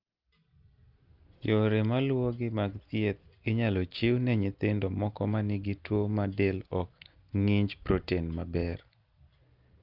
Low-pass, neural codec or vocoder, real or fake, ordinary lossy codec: 5.4 kHz; none; real; Opus, 32 kbps